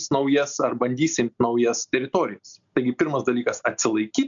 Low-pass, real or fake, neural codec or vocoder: 7.2 kHz; real; none